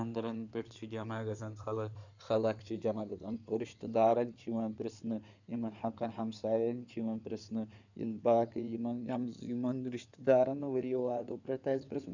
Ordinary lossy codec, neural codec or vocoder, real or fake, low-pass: none; codec, 16 kHz in and 24 kHz out, 2.2 kbps, FireRedTTS-2 codec; fake; 7.2 kHz